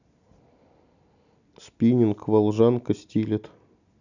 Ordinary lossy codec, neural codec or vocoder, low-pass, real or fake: none; none; 7.2 kHz; real